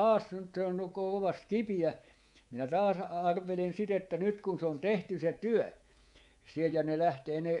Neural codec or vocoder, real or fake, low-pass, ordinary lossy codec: codec, 24 kHz, 3.1 kbps, DualCodec; fake; 10.8 kHz; MP3, 64 kbps